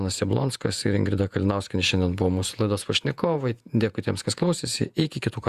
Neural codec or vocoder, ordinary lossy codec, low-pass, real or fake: vocoder, 48 kHz, 128 mel bands, Vocos; Opus, 64 kbps; 14.4 kHz; fake